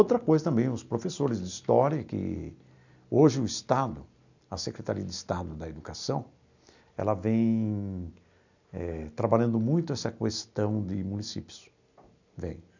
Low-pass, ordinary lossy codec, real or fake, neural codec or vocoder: 7.2 kHz; none; real; none